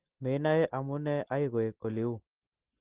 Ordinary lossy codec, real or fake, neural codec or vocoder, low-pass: Opus, 16 kbps; real; none; 3.6 kHz